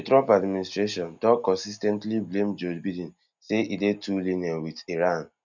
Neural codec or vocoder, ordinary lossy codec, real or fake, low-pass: none; none; real; 7.2 kHz